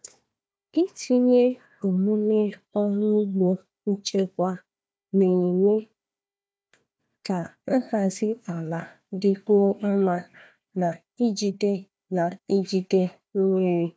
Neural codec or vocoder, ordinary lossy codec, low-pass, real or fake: codec, 16 kHz, 1 kbps, FunCodec, trained on Chinese and English, 50 frames a second; none; none; fake